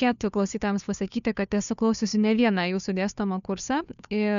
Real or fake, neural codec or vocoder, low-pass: fake; codec, 16 kHz, 2 kbps, FunCodec, trained on Chinese and English, 25 frames a second; 7.2 kHz